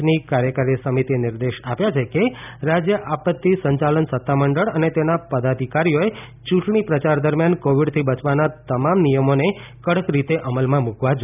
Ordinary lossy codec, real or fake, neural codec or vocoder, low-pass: none; real; none; 3.6 kHz